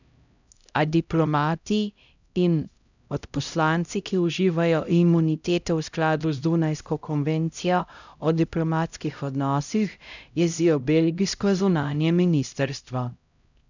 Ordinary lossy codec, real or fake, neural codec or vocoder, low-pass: none; fake; codec, 16 kHz, 0.5 kbps, X-Codec, HuBERT features, trained on LibriSpeech; 7.2 kHz